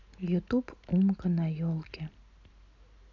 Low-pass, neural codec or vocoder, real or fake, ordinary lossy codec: 7.2 kHz; none; real; none